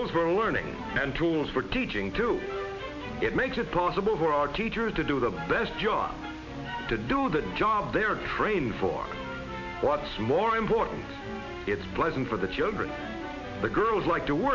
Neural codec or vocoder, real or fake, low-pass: none; real; 7.2 kHz